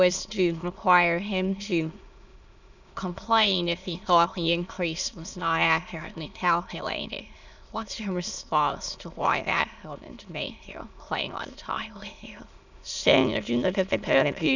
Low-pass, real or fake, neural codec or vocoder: 7.2 kHz; fake; autoencoder, 22.05 kHz, a latent of 192 numbers a frame, VITS, trained on many speakers